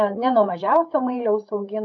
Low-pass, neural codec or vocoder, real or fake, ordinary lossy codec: 7.2 kHz; codec, 16 kHz, 8 kbps, FreqCodec, larger model; fake; AAC, 64 kbps